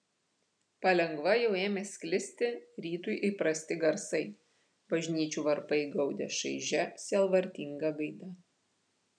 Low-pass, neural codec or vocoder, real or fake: 9.9 kHz; none; real